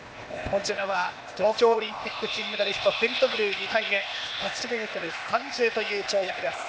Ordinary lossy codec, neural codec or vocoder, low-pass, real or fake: none; codec, 16 kHz, 0.8 kbps, ZipCodec; none; fake